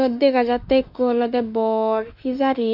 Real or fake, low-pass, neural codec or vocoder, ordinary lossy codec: fake; 5.4 kHz; autoencoder, 48 kHz, 32 numbers a frame, DAC-VAE, trained on Japanese speech; none